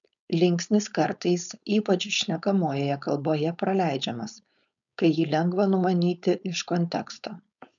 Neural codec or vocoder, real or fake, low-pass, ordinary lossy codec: codec, 16 kHz, 4.8 kbps, FACodec; fake; 7.2 kHz; MP3, 96 kbps